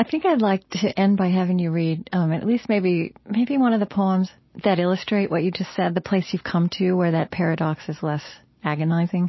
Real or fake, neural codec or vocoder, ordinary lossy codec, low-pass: real; none; MP3, 24 kbps; 7.2 kHz